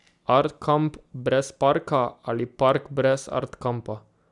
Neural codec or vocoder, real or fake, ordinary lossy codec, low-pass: none; real; none; 10.8 kHz